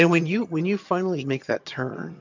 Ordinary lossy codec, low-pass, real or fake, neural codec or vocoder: MP3, 64 kbps; 7.2 kHz; fake; vocoder, 22.05 kHz, 80 mel bands, HiFi-GAN